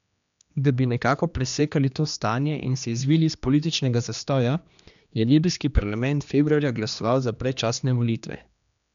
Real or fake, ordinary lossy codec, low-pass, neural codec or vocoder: fake; none; 7.2 kHz; codec, 16 kHz, 2 kbps, X-Codec, HuBERT features, trained on general audio